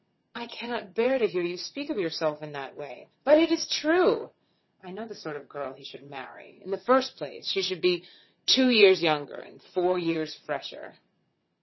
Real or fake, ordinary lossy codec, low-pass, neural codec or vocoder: fake; MP3, 24 kbps; 7.2 kHz; vocoder, 22.05 kHz, 80 mel bands, WaveNeXt